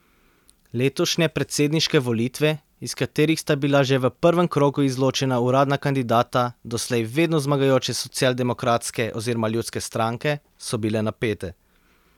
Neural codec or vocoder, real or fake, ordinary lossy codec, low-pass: none; real; none; 19.8 kHz